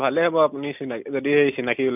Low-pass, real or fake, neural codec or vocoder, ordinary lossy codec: 3.6 kHz; real; none; none